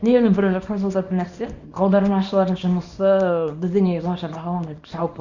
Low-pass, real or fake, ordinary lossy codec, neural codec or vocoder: 7.2 kHz; fake; none; codec, 24 kHz, 0.9 kbps, WavTokenizer, small release